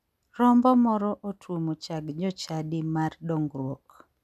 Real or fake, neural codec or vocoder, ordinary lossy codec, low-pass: real; none; none; 14.4 kHz